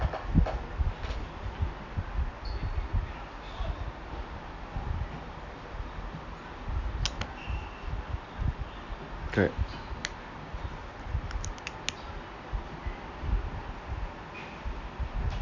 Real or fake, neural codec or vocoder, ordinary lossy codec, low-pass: real; none; none; 7.2 kHz